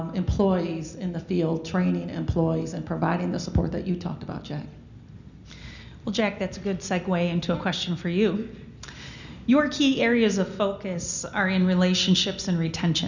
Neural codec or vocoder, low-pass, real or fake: none; 7.2 kHz; real